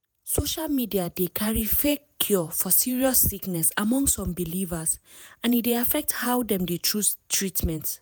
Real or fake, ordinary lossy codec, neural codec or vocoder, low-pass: fake; none; vocoder, 48 kHz, 128 mel bands, Vocos; none